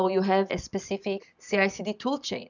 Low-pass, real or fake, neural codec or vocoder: 7.2 kHz; real; none